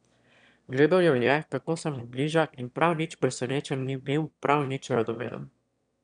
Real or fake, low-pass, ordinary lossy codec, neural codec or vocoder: fake; 9.9 kHz; none; autoencoder, 22.05 kHz, a latent of 192 numbers a frame, VITS, trained on one speaker